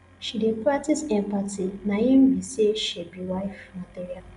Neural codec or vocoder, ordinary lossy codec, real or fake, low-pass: none; none; real; 10.8 kHz